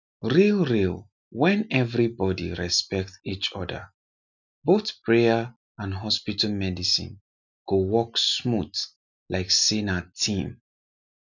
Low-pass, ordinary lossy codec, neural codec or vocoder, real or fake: 7.2 kHz; none; none; real